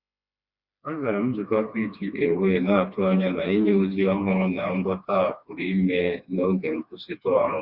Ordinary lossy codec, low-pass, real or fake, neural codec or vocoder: Opus, 64 kbps; 5.4 kHz; fake; codec, 16 kHz, 2 kbps, FreqCodec, smaller model